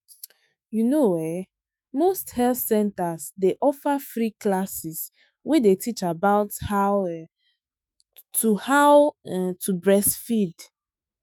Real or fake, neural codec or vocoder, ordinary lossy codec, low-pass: fake; autoencoder, 48 kHz, 128 numbers a frame, DAC-VAE, trained on Japanese speech; none; none